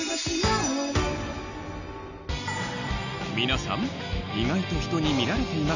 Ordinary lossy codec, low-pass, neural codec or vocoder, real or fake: none; 7.2 kHz; none; real